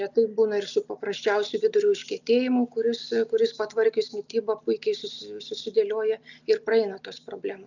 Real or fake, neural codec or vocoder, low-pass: real; none; 7.2 kHz